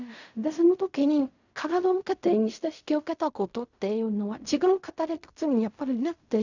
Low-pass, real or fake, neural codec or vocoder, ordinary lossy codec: 7.2 kHz; fake; codec, 16 kHz in and 24 kHz out, 0.4 kbps, LongCat-Audio-Codec, fine tuned four codebook decoder; none